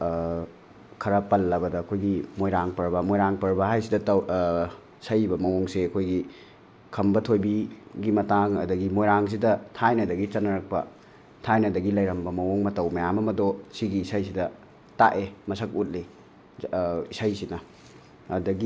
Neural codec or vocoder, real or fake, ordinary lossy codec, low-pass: none; real; none; none